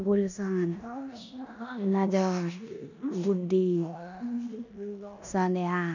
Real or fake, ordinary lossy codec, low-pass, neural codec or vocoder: fake; none; 7.2 kHz; codec, 16 kHz in and 24 kHz out, 0.9 kbps, LongCat-Audio-Codec, four codebook decoder